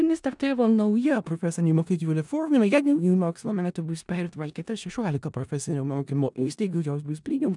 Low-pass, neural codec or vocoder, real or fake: 10.8 kHz; codec, 16 kHz in and 24 kHz out, 0.4 kbps, LongCat-Audio-Codec, four codebook decoder; fake